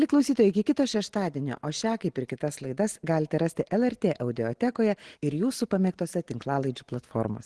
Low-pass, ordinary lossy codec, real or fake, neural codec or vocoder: 10.8 kHz; Opus, 16 kbps; real; none